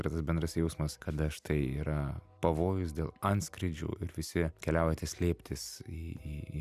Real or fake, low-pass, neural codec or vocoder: real; 14.4 kHz; none